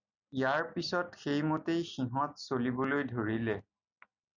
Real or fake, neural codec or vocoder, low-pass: real; none; 7.2 kHz